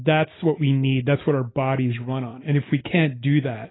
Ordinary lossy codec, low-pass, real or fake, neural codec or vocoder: AAC, 16 kbps; 7.2 kHz; real; none